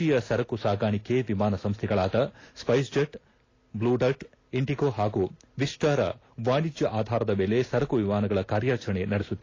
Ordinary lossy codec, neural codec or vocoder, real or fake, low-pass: AAC, 32 kbps; none; real; 7.2 kHz